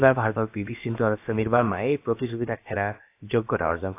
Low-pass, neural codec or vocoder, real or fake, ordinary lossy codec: 3.6 kHz; codec, 16 kHz, about 1 kbps, DyCAST, with the encoder's durations; fake; AAC, 24 kbps